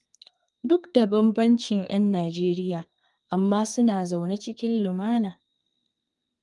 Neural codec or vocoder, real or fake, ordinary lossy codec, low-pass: codec, 32 kHz, 1.9 kbps, SNAC; fake; Opus, 32 kbps; 10.8 kHz